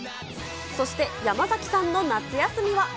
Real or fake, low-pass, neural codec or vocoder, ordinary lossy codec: real; none; none; none